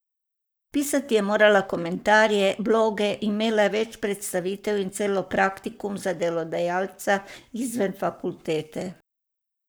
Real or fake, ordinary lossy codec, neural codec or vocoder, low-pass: fake; none; codec, 44.1 kHz, 7.8 kbps, Pupu-Codec; none